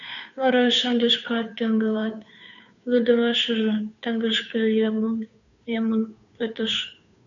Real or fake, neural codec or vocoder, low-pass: fake; codec, 16 kHz, 2 kbps, FunCodec, trained on Chinese and English, 25 frames a second; 7.2 kHz